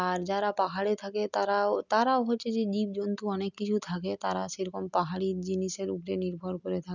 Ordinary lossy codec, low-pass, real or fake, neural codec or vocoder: none; 7.2 kHz; real; none